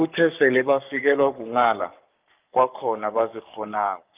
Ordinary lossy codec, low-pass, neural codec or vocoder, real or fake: Opus, 24 kbps; 3.6 kHz; codec, 44.1 kHz, 7.8 kbps, Pupu-Codec; fake